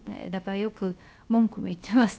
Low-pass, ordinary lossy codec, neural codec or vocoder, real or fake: none; none; codec, 16 kHz, about 1 kbps, DyCAST, with the encoder's durations; fake